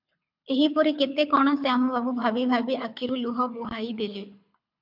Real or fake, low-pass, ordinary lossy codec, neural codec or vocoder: fake; 5.4 kHz; MP3, 48 kbps; codec, 24 kHz, 6 kbps, HILCodec